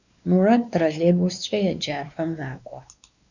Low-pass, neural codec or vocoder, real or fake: 7.2 kHz; codec, 16 kHz, 2 kbps, X-Codec, WavLM features, trained on Multilingual LibriSpeech; fake